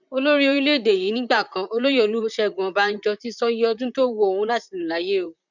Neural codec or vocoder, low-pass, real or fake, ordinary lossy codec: vocoder, 44.1 kHz, 128 mel bands, Pupu-Vocoder; 7.2 kHz; fake; none